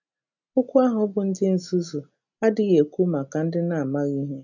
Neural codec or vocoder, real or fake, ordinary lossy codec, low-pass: none; real; none; 7.2 kHz